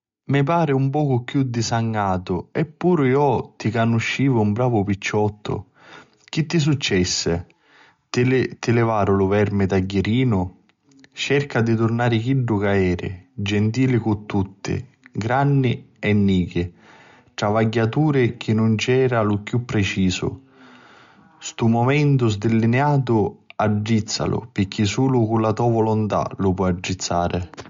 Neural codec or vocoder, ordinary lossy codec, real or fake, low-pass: none; MP3, 48 kbps; real; 7.2 kHz